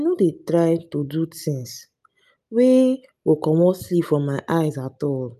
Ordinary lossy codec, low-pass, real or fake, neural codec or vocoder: none; 14.4 kHz; real; none